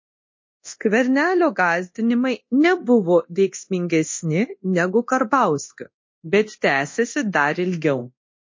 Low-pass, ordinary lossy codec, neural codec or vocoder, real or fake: 7.2 kHz; MP3, 32 kbps; codec, 24 kHz, 0.9 kbps, DualCodec; fake